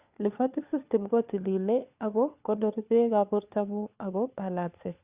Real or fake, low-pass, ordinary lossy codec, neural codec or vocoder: fake; 3.6 kHz; Opus, 64 kbps; codec, 16 kHz, 4 kbps, FunCodec, trained on LibriTTS, 50 frames a second